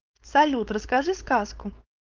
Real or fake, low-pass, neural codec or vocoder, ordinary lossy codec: fake; 7.2 kHz; codec, 16 kHz, 4.8 kbps, FACodec; Opus, 32 kbps